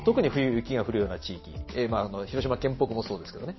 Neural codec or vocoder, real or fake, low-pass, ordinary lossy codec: none; real; 7.2 kHz; MP3, 24 kbps